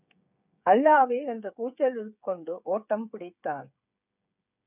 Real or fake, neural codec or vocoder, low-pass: fake; codec, 16 kHz, 8 kbps, FreqCodec, smaller model; 3.6 kHz